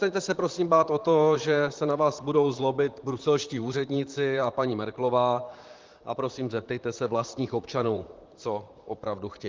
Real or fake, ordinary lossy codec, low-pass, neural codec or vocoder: fake; Opus, 32 kbps; 7.2 kHz; vocoder, 44.1 kHz, 128 mel bands, Pupu-Vocoder